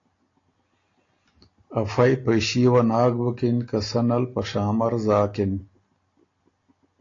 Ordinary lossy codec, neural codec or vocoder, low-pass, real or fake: AAC, 32 kbps; none; 7.2 kHz; real